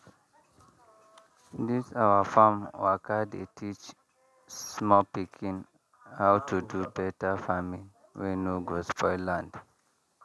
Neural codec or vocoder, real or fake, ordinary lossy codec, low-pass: none; real; none; none